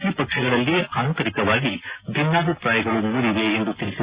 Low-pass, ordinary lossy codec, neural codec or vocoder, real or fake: 3.6 kHz; Opus, 64 kbps; none; real